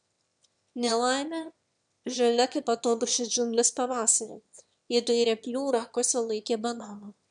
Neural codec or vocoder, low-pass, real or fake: autoencoder, 22.05 kHz, a latent of 192 numbers a frame, VITS, trained on one speaker; 9.9 kHz; fake